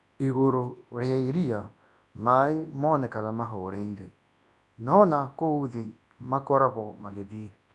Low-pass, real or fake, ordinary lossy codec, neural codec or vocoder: 10.8 kHz; fake; none; codec, 24 kHz, 0.9 kbps, WavTokenizer, large speech release